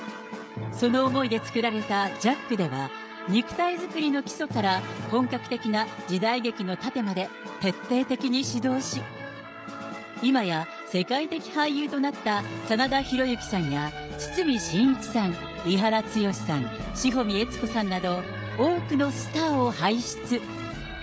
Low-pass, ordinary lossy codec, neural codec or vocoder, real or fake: none; none; codec, 16 kHz, 16 kbps, FreqCodec, smaller model; fake